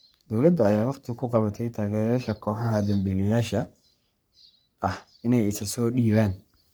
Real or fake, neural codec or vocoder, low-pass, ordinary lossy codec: fake; codec, 44.1 kHz, 3.4 kbps, Pupu-Codec; none; none